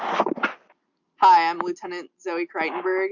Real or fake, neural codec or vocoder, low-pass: real; none; 7.2 kHz